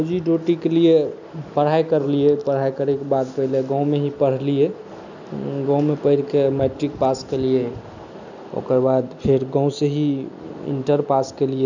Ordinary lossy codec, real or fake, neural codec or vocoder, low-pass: none; real; none; 7.2 kHz